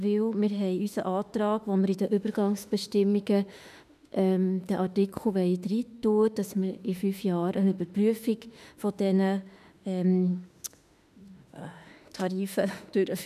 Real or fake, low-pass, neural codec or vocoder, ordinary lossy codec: fake; 14.4 kHz; autoencoder, 48 kHz, 32 numbers a frame, DAC-VAE, trained on Japanese speech; none